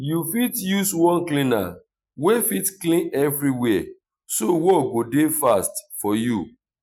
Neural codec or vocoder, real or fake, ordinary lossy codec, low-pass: none; real; none; none